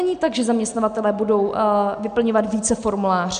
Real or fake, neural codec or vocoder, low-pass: real; none; 9.9 kHz